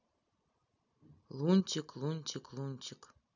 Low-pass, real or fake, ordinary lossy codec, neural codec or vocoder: 7.2 kHz; real; none; none